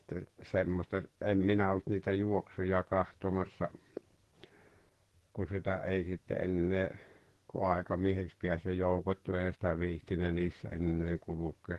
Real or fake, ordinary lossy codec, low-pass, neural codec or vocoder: fake; Opus, 16 kbps; 14.4 kHz; codec, 44.1 kHz, 2.6 kbps, SNAC